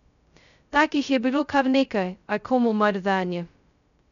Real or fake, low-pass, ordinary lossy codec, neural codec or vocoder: fake; 7.2 kHz; none; codec, 16 kHz, 0.2 kbps, FocalCodec